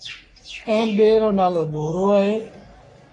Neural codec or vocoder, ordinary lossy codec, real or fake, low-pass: codec, 44.1 kHz, 3.4 kbps, Pupu-Codec; AAC, 32 kbps; fake; 10.8 kHz